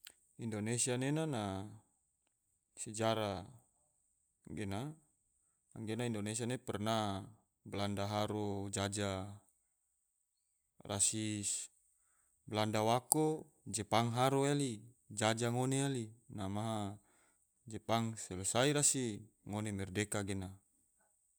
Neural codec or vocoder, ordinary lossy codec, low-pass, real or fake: none; none; none; real